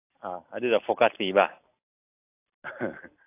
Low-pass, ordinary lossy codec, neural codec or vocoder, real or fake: 3.6 kHz; AAC, 32 kbps; none; real